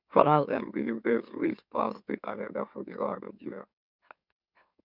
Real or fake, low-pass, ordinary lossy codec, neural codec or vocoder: fake; 5.4 kHz; none; autoencoder, 44.1 kHz, a latent of 192 numbers a frame, MeloTTS